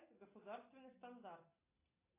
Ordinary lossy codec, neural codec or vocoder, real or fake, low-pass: AAC, 24 kbps; none; real; 3.6 kHz